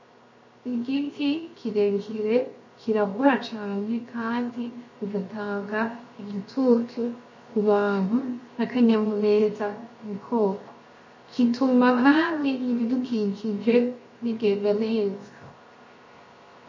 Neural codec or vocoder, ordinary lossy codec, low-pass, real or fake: codec, 16 kHz, 0.7 kbps, FocalCodec; MP3, 32 kbps; 7.2 kHz; fake